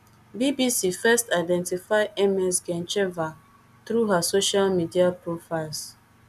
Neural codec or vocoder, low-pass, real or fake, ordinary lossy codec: none; 14.4 kHz; real; none